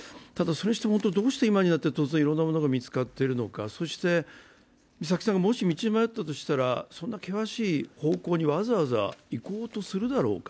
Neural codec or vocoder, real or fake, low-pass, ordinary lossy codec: none; real; none; none